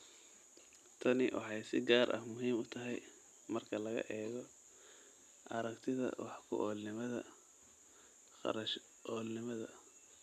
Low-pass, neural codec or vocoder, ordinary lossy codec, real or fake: 14.4 kHz; none; none; real